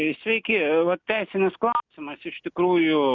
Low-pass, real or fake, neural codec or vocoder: 7.2 kHz; real; none